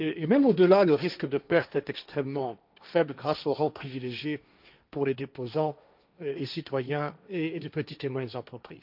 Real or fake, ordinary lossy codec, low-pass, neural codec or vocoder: fake; none; 5.4 kHz; codec, 16 kHz, 1.1 kbps, Voila-Tokenizer